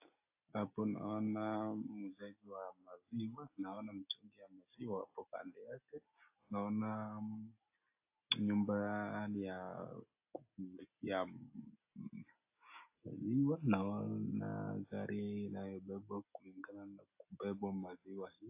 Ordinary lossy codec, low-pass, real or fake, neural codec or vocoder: AAC, 32 kbps; 3.6 kHz; real; none